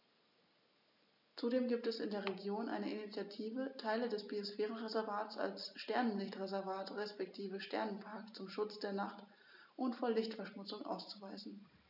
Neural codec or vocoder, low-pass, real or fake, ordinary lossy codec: none; 5.4 kHz; real; none